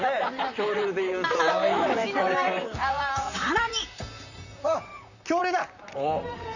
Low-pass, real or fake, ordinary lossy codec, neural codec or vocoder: 7.2 kHz; fake; none; vocoder, 44.1 kHz, 128 mel bands, Pupu-Vocoder